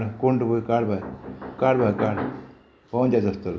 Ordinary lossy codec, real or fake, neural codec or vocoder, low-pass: none; real; none; none